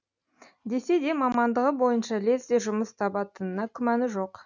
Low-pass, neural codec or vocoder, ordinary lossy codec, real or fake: 7.2 kHz; none; none; real